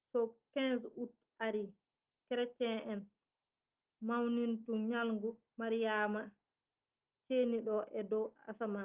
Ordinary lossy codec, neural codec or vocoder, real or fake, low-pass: Opus, 32 kbps; none; real; 3.6 kHz